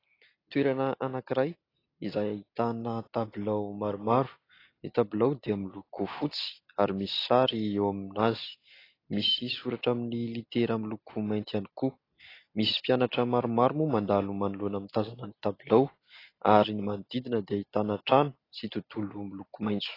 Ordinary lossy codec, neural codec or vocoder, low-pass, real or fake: AAC, 24 kbps; none; 5.4 kHz; real